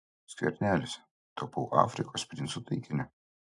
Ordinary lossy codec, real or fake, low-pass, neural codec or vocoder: AAC, 64 kbps; real; 10.8 kHz; none